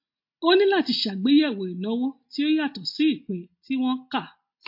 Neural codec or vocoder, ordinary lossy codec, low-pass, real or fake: none; MP3, 32 kbps; 5.4 kHz; real